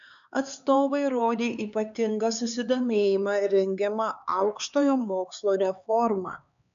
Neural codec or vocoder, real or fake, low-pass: codec, 16 kHz, 4 kbps, X-Codec, HuBERT features, trained on LibriSpeech; fake; 7.2 kHz